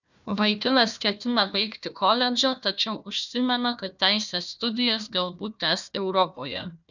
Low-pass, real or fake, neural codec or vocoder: 7.2 kHz; fake; codec, 16 kHz, 1 kbps, FunCodec, trained on Chinese and English, 50 frames a second